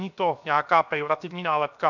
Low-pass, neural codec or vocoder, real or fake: 7.2 kHz; codec, 16 kHz, 0.7 kbps, FocalCodec; fake